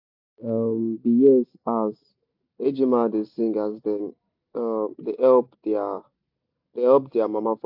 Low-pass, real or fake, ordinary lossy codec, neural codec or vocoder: 5.4 kHz; real; AAC, 48 kbps; none